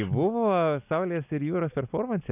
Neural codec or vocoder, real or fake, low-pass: none; real; 3.6 kHz